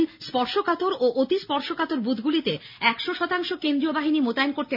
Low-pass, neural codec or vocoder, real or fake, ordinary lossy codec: 5.4 kHz; none; real; none